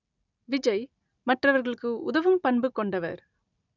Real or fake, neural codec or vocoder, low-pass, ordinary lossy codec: real; none; 7.2 kHz; none